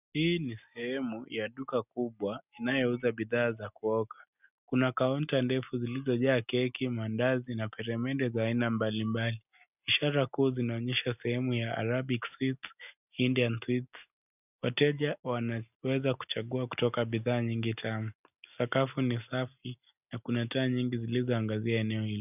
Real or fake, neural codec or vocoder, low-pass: real; none; 3.6 kHz